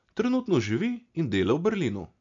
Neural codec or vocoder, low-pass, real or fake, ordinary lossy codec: none; 7.2 kHz; real; MP3, 64 kbps